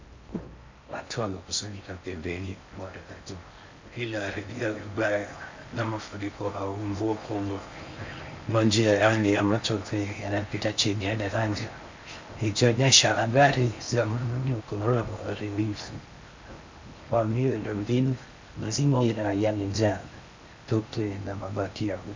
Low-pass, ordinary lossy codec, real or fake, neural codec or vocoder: 7.2 kHz; MP3, 64 kbps; fake; codec, 16 kHz in and 24 kHz out, 0.6 kbps, FocalCodec, streaming, 4096 codes